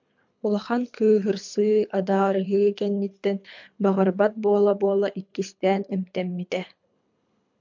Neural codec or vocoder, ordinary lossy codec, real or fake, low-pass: codec, 24 kHz, 3 kbps, HILCodec; MP3, 64 kbps; fake; 7.2 kHz